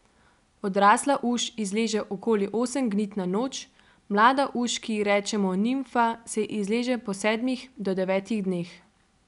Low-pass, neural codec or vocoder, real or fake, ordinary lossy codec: 10.8 kHz; none; real; none